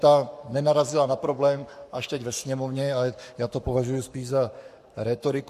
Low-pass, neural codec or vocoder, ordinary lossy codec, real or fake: 14.4 kHz; codec, 44.1 kHz, 7.8 kbps, Pupu-Codec; MP3, 64 kbps; fake